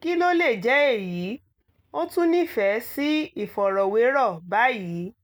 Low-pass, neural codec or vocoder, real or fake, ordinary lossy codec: none; none; real; none